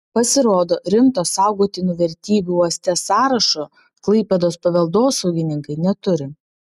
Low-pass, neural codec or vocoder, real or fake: 14.4 kHz; none; real